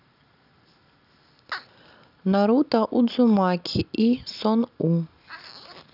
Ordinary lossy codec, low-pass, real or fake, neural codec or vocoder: none; 5.4 kHz; real; none